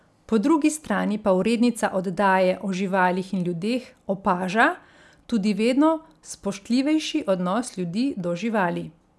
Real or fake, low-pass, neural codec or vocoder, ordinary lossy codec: real; none; none; none